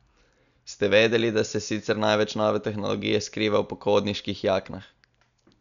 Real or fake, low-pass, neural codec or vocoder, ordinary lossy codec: real; 7.2 kHz; none; none